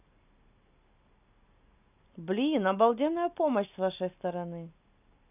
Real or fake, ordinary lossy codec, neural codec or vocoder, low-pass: real; none; none; 3.6 kHz